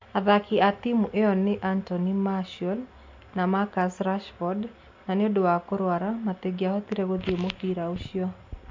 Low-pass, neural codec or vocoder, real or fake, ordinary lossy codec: 7.2 kHz; none; real; MP3, 48 kbps